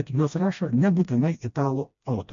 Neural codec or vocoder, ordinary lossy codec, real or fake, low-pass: codec, 16 kHz, 1 kbps, FreqCodec, smaller model; MP3, 48 kbps; fake; 7.2 kHz